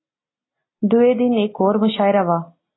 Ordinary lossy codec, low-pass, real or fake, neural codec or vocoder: AAC, 16 kbps; 7.2 kHz; real; none